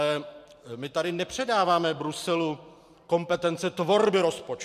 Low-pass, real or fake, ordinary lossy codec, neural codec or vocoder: 14.4 kHz; fake; MP3, 96 kbps; vocoder, 44.1 kHz, 128 mel bands every 512 samples, BigVGAN v2